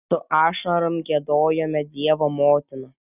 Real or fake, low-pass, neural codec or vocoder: real; 3.6 kHz; none